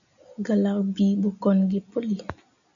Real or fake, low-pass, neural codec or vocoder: real; 7.2 kHz; none